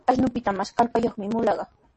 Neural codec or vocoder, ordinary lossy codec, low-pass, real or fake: none; MP3, 32 kbps; 10.8 kHz; real